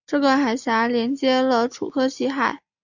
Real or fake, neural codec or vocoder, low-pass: real; none; 7.2 kHz